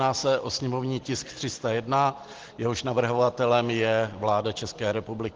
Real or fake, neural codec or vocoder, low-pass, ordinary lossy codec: real; none; 7.2 kHz; Opus, 16 kbps